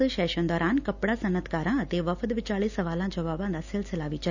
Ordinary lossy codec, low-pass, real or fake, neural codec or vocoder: none; 7.2 kHz; real; none